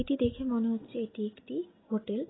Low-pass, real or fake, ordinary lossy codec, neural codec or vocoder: 7.2 kHz; real; AAC, 16 kbps; none